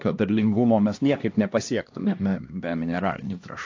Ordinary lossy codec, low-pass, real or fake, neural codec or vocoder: AAC, 48 kbps; 7.2 kHz; fake; codec, 16 kHz, 1 kbps, X-Codec, HuBERT features, trained on LibriSpeech